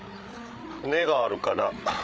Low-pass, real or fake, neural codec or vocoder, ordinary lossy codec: none; fake; codec, 16 kHz, 8 kbps, FreqCodec, larger model; none